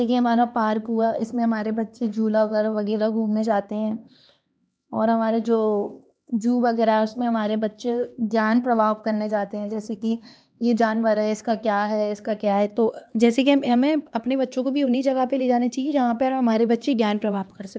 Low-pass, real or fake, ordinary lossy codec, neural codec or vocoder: none; fake; none; codec, 16 kHz, 2 kbps, X-Codec, HuBERT features, trained on LibriSpeech